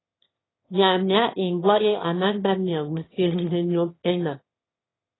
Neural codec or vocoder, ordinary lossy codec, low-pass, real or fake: autoencoder, 22.05 kHz, a latent of 192 numbers a frame, VITS, trained on one speaker; AAC, 16 kbps; 7.2 kHz; fake